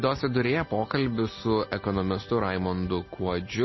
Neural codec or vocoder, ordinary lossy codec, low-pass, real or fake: none; MP3, 24 kbps; 7.2 kHz; real